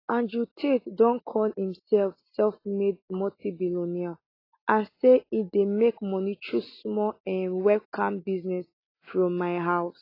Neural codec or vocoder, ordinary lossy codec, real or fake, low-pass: none; AAC, 24 kbps; real; 5.4 kHz